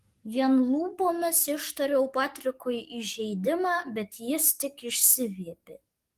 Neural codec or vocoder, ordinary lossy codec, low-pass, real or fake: codec, 44.1 kHz, 7.8 kbps, DAC; Opus, 24 kbps; 14.4 kHz; fake